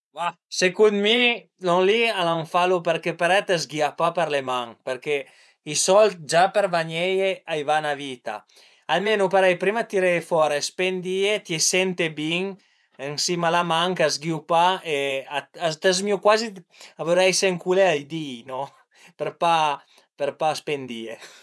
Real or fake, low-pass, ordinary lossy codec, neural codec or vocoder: fake; none; none; vocoder, 24 kHz, 100 mel bands, Vocos